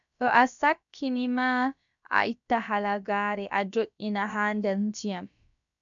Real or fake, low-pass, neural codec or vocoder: fake; 7.2 kHz; codec, 16 kHz, about 1 kbps, DyCAST, with the encoder's durations